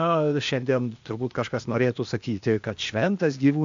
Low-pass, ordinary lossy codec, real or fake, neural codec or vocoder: 7.2 kHz; AAC, 64 kbps; fake; codec, 16 kHz, 0.8 kbps, ZipCodec